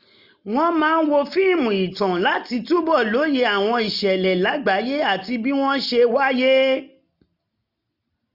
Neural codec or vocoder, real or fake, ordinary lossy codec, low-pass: none; real; none; 5.4 kHz